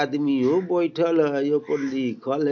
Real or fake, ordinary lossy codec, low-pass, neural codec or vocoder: real; none; 7.2 kHz; none